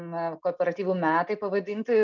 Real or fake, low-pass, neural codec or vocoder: real; 7.2 kHz; none